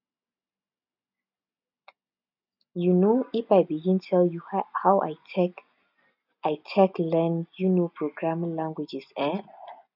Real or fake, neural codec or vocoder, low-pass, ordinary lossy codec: real; none; 5.4 kHz; none